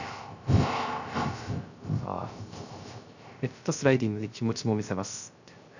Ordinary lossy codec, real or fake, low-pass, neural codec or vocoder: none; fake; 7.2 kHz; codec, 16 kHz, 0.3 kbps, FocalCodec